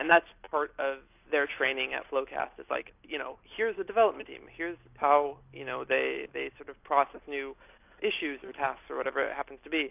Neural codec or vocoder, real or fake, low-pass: none; real; 3.6 kHz